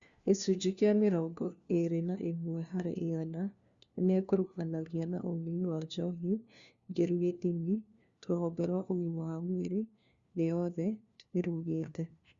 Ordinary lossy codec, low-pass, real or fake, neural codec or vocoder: Opus, 64 kbps; 7.2 kHz; fake; codec, 16 kHz, 1 kbps, FunCodec, trained on LibriTTS, 50 frames a second